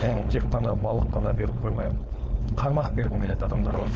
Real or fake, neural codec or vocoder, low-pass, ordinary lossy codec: fake; codec, 16 kHz, 4.8 kbps, FACodec; none; none